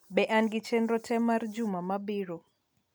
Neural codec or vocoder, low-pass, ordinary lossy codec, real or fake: none; 19.8 kHz; MP3, 96 kbps; real